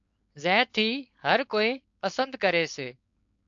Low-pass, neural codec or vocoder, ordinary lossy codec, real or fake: 7.2 kHz; codec, 16 kHz, 4.8 kbps, FACodec; AAC, 64 kbps; fake